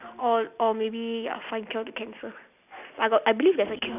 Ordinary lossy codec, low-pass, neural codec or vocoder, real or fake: none; 3.6 kHz; none; real